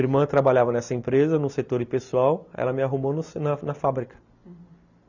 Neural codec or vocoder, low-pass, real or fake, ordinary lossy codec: none; 7.2 kHz; real; none